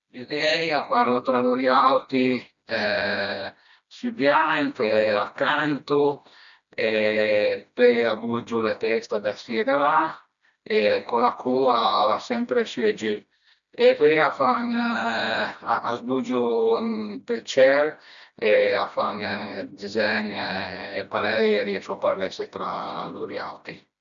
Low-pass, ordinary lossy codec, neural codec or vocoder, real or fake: 7.2 kHz; MP3, 96 kbps; codec, 16 kHz, 1 kbps, FreqCodec, smaller model; fake